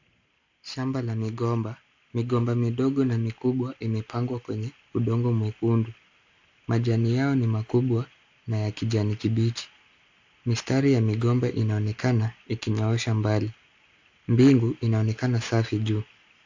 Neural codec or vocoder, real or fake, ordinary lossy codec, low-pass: none; real; AAC, 48 kbps; 7.2 kHz